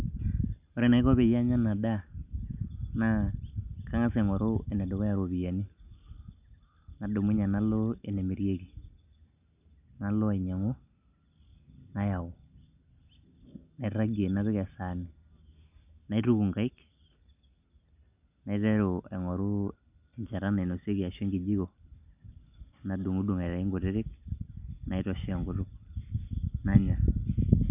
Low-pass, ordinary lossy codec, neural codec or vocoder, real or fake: 3.6 kHz; none; none; real